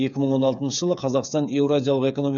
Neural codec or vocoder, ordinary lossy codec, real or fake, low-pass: codec, 16 kHz, 8 kbps, FreqCodec, smaller model; none; fake; 7.2 kHz